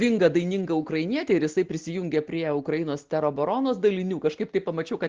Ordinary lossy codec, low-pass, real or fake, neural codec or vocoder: Opus, 16 kbps; 7.2 kHz; real; none